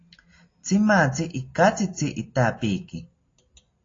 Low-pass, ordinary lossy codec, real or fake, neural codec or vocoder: 7.2 kHz; AAC, 32 kbps; real; none